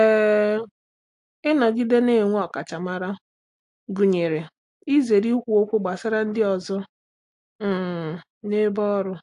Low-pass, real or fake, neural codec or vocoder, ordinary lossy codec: 10.8 kHz; real; none; AAC, 64 kbps